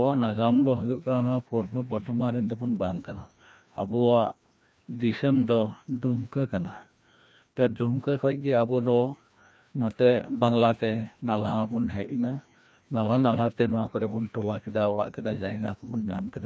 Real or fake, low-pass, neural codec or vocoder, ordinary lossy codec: fake; none; codec, 16 kHz, 1 kbps, FreqCodec, larger model; none